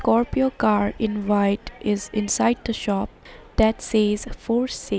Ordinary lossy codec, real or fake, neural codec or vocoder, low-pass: none; real; none; none